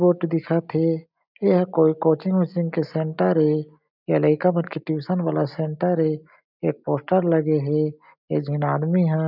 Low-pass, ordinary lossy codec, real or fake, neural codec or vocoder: 5.4 kHz; none; real; none